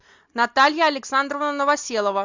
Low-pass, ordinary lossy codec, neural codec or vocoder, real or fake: 7.2 kHz; MP3, 64 kbps; none; real